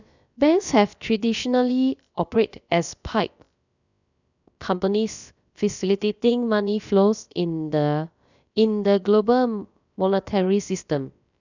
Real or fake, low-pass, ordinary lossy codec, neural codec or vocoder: fake; 7.2 kHz; none; codec, 16 kHz, about 1 kbps, DyCAST, with the encoder's durations